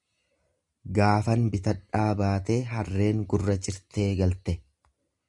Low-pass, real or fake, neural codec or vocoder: 10.8 kHz; real; none